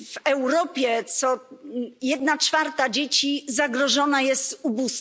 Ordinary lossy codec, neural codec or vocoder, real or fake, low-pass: none; none; real; none